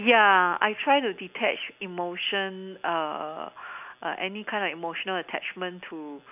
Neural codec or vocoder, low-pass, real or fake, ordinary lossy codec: none; 3.6 kHz; real; none